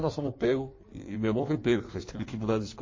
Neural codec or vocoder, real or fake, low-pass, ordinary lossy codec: codec, 16 kHz in and 24 kHz out, 1.1 kbps, FireRedTTS-2 codec; fake; 7.2 kHz; MP3, 32 kbps